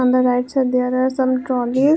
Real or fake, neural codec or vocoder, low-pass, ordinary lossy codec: real; none; none; none